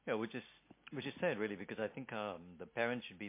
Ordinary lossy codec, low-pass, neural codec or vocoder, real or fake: MP3, 24 kbps; 3.6 kHz; none; real